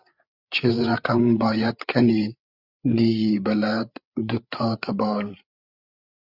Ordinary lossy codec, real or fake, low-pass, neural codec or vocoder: Opus, 64 kbps; fake; 5.4 kHz; codec, 16 kHz, 8 kbps, FreqCodec, larger model